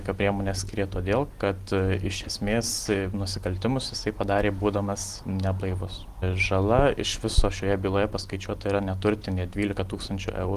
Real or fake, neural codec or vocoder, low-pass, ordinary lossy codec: real; none; 14.4 kHz; Opus, 24 kbps